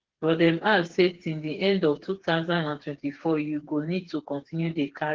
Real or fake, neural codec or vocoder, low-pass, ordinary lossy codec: fake; codec, 16 kHz, 4 kbps, FreqCodec, smaller model; 7.2 kHz; Opus, 16 kbps